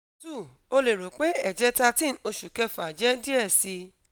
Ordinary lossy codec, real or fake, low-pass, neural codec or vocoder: none; real; none; none